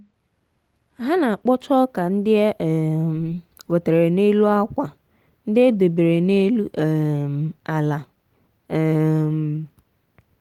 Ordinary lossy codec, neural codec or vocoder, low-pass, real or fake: Opus, 24 kbps; none; 19.8 kHz; real